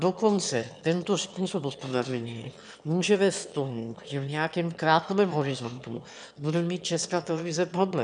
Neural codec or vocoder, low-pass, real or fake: autoencoder, 22.05 kHz, a latent of 192 numbers a frame, VITS, trained on one speaker; 9.9 kHz; fake